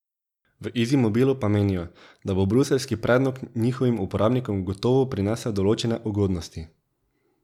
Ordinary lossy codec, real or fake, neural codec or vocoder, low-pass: none; real; none; 19.8 kHz